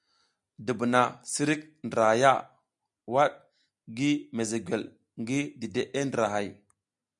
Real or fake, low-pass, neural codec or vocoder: real; 10.8 kHz; none